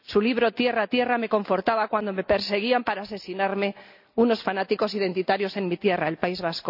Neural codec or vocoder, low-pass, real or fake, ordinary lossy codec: none; 5.4 kHz; real; none